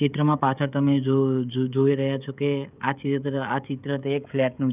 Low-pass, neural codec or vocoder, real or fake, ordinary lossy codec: 3.6 kHz; codec, 16 kHz, 16 kbps, FreqCodec, smaller model; fake; none